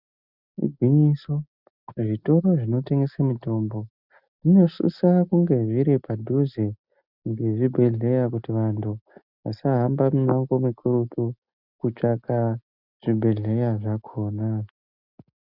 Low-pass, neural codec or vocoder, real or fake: 5.4 kHz; none; real